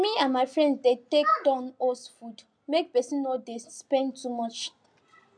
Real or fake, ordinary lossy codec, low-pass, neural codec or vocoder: real; MP3, 96 kbps; 9.9 kHz; none